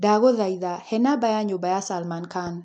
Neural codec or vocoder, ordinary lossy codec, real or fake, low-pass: none; MP3, 64 kbps; real; 9.9 kHz